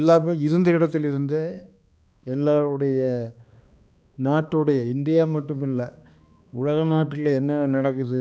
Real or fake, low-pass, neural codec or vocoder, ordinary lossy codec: fake; none; codec, 16 kHz, 2 kbps, X-Codec, HuBERT features, trained on balanced general audio; none